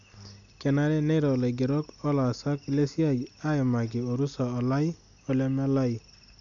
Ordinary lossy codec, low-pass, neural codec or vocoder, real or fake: none; 7.2 kHz; none; real